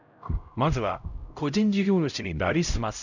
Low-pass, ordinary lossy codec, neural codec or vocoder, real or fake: 7.2 kHz; none; codec, 16 kHz, 0.5 kbps, X-Codec, HuBERT features, trained on LibriSpeech; fake